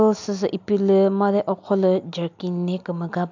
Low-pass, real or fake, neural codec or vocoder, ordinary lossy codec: 7.2 kHz; real; none; MP3, 64 kbps